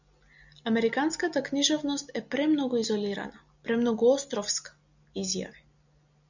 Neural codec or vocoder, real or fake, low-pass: none; real; 7.2 kHz